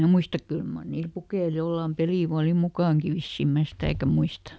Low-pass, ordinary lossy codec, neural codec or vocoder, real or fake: none; none; none; real